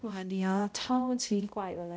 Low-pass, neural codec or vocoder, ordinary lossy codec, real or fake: none; codec, 16 kHz, 0.5 kbps, X-Codec, HuBERT features, trained on balanced general audio; none; fake